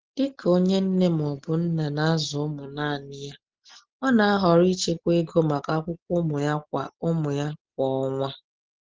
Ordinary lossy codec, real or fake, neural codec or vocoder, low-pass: Opus, 16 kbps; real; none; 7.2 kHz